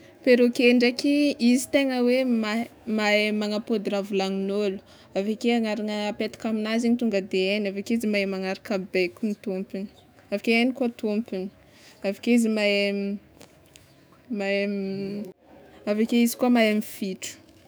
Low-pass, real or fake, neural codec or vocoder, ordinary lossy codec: none; fake; autoencoder, 48 kHz, 128 numbers a frame, DAC-VAE, trained on Japanese speech; none